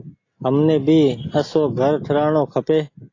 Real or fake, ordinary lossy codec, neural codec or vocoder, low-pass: real; AAC, 32 kbps; none; 7.2 kHz